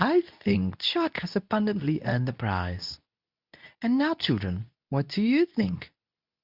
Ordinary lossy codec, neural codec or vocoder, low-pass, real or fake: Opus, 64 kbps; codec, 24 kHz, 0.9 kbps, WavTokenizer, medium speech release version 2; 5.4 kHz; fake